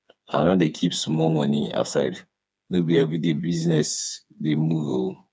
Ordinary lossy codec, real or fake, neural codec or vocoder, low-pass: none; fake; codec, 16 kHz, 4 kbps, FreqCodec, smaller model; none